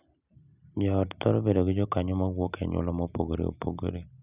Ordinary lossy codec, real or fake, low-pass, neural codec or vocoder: none; real; 3.6 kHz; none